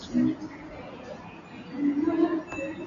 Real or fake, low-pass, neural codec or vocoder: real; 7.2 kHz; none